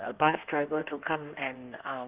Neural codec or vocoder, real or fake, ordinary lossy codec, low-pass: codec, 16 kHz in and 24 kHz out, 1.1 kbps, FireRedTTS-2 codec; fake; Opus, 32 kbps; 3.6 kHz